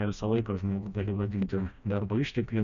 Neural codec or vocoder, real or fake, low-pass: codec, 16 kHz, 1 kbps, FreqCodec, smaller model; fake; 7.2 kHz